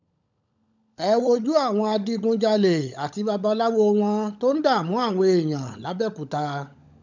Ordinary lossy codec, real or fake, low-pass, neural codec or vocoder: none; fake; 7.2 kHz; codec, 16 kHz, 16 kbps, FunCodec, trained on LibriTTS, 50 frames a second